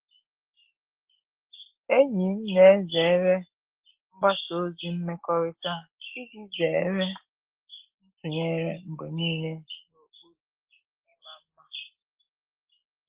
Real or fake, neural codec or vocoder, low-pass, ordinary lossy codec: real; none; 3.6 kHz; Opus, 16 kbps